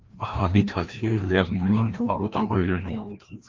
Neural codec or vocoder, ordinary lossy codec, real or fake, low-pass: codec, 16 kHz, 1 kbps, FreqCodec, larger model; Opus, 32 kbps; fake; 7.2 kHz